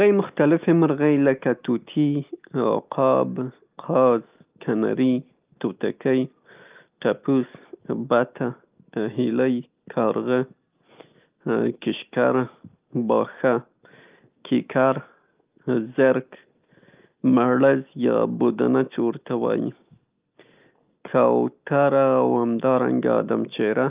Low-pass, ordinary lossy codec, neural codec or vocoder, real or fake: 3.6 kHz; Opus, 24 kbps; none; real